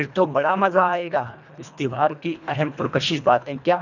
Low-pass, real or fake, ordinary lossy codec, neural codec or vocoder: 7.2 kHz; fake; none; codec, 24 kHz, 1.5 kbps, HILCodec